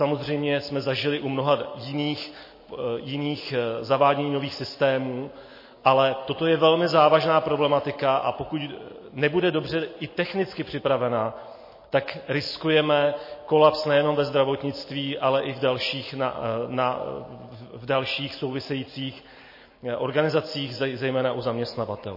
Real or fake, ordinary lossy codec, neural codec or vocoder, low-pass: real; MP3, 24 kbps; none; 5.4 kHz